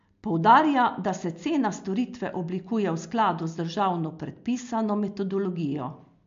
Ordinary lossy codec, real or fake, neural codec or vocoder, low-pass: MP3, 48 kbps; real; none; 7.2 kHz